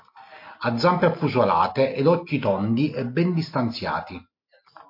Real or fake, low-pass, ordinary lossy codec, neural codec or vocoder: real; 5.4 kHz; MP3, 32 kbps; none